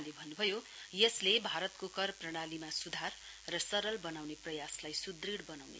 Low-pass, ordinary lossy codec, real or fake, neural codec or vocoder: none; none; real; none